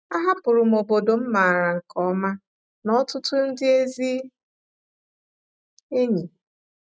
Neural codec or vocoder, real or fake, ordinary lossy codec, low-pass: none; real; none; none